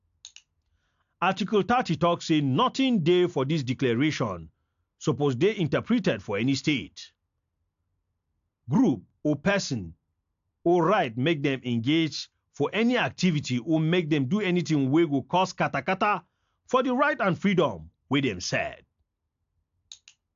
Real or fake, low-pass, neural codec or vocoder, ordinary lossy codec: real; 7.2 kHz; none; AAC, 64 kbps